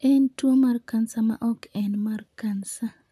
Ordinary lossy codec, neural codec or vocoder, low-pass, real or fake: none; vocoder, 44.1 kHz, 128 mel bands, Pupu-Vocoder; 14.4 kHz; fake